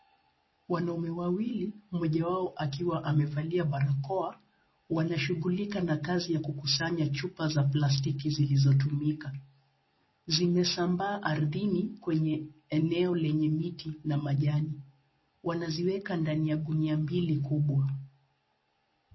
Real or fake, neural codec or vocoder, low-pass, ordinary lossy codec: real; none; 7.2 kHz; MP3, 24 kbps